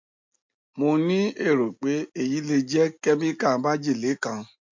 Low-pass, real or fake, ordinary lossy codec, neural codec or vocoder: 7.2 kHz; real; MP3, 48 kbps; none